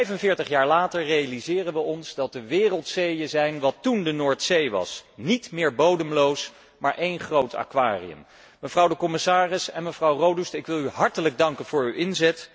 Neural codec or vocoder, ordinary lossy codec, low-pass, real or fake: none; none; none; real